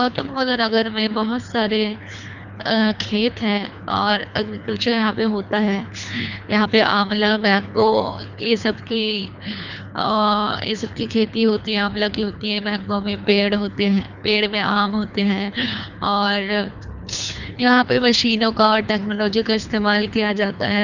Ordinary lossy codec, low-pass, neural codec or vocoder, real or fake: none; 7.2 kHz; codec, 24 kHz, 3 kbps, HILCodec; fake